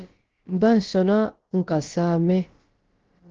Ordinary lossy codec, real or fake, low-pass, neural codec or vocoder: Opus, 16 kbps; fake; 7.2 kHz; codec, 16 kHz, about 1 kbps, DyCAST, with the encoder's durations